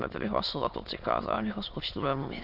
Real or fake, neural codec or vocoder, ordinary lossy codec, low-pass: fake; autoencoder, 22.05 kHz, a latent of 192 numbers a frame, VITS, trained on many speakers; MP3, 48 kbps; 5.4 kHz